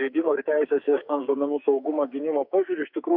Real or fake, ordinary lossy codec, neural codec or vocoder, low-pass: fake; MP3, 48 kbps; codec, 44.1 kHz, 2.6 kbps, SNAC; 5.4 kHz